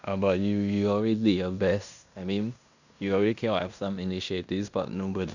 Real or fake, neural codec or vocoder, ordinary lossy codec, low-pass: fake; codec, 16 kHz in and 24 kHz out, 0.9 kbps, LongCat-Audio-Codec, fine tuned four codebook decoder; none; 7.2 kHz